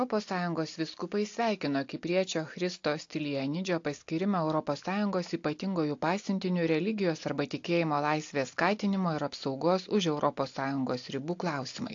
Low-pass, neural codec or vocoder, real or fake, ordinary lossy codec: 7.2 kHz; none; real; AAC, 48 kbps